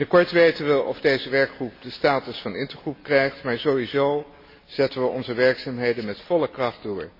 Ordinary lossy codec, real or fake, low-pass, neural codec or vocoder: MP3, 24 kbps; real; 5.4 kHz; none